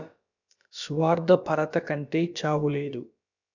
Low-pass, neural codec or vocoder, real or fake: 7.2 kHz; codec, 16 kHz, about 1 kbps, DyCAST, with the encoder's durations; fake